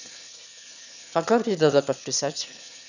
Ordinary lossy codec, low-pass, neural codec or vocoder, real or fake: none; 7.2 kHz; autoencoder, 22.05 kHz, a latent of 192 numbers a frame, VITS, trained on one speaker; fake